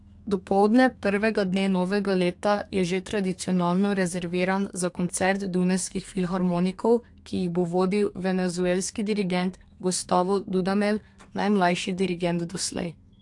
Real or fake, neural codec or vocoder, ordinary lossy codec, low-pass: fake; codec, 32 kHz, 1.9 kbps, SNAC; AAC, 64 kbps; 10.8 kHz